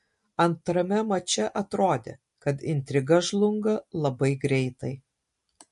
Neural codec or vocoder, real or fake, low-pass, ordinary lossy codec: none; real; 14.4 kHz; MP3, 48 kbps